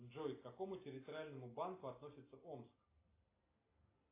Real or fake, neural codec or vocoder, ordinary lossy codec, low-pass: real; none; AAC, 24 kbps; 3.6 kHz